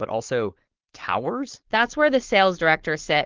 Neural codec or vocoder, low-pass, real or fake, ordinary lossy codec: codec, 16 kHz, 4.8 kbps, FACodec; 7.2 kHz; fake; Opus, 16 kbps